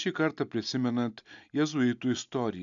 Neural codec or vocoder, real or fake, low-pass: none; real; 7.2 kHz